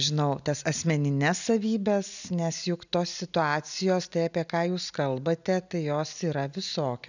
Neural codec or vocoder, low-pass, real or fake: none; 7.2 kHz; real